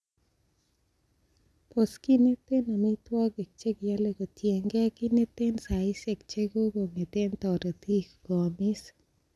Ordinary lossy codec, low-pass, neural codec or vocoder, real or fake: none; none; none; real